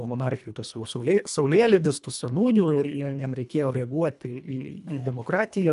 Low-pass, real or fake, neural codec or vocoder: 10.8 kHz; fake; codec, 24 kHz, 1.5 kbps, HILCodec